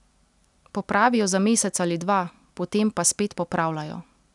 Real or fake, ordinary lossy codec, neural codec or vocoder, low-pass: real; none; none; 10.8 kHz